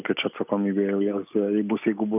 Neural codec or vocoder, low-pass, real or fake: none; 3.6 kHz; real